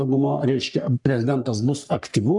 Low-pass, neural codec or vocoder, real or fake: 10.8 kHz; codec, 44.1 kHz, 3.4 kbps, Pupu-Codec; fake